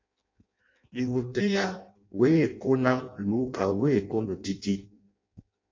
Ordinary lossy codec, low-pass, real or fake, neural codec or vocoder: MP3, 48 kbps; 7.2 kHz; fake; codec, 16 kHz in and 24 kHz out, 0.6 kbps, FireRedTTS-2 codec